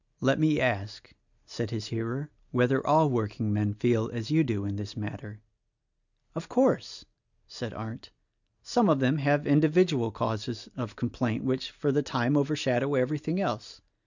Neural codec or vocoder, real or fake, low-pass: none; real; 7.2 kHz